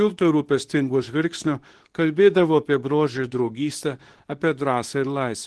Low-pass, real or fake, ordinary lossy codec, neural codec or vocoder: 10.8 kHz; fake; Opus, 16 kbps; codec, 24 kHz, 0.9 kbps, WavTokenizer, medium speech release version 2